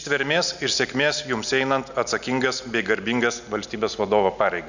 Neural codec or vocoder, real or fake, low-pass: none; real; 7.2 kHz